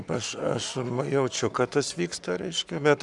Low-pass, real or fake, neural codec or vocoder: 10.8 kHz; fake; codec, 44.1 kHz, 7.8 kbps, Pupu-Codec